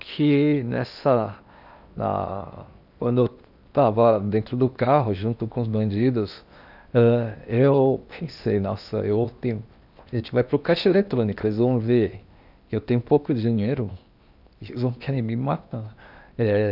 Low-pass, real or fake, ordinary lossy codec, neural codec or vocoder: 5.4 kHz; fake; none; codec, 16 kHz in and 24 kHz out, 0.8 kbps, FocalCodec, streaming, 65536 codes